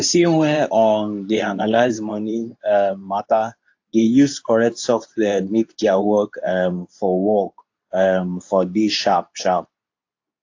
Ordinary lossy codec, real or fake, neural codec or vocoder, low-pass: AAC, 48 kbps; fake; codec, 24 kHz, 0.9 kbps, WavTokenizer, medium speech release version 2; 7.2 kHz